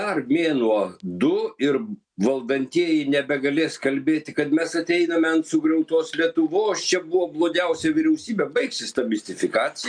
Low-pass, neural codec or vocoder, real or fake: 9.9 kHz; none; real